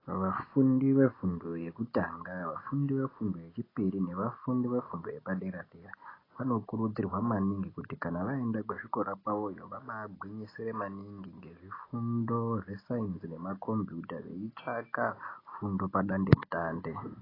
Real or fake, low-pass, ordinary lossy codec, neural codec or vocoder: real; 5.4 kHz; AAC, 24 kbps; none